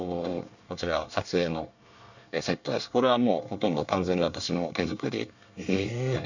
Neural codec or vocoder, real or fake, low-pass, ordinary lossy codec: codec, 24 kHz, 1 kbps, SNAC; fake; 7.2 kHz; none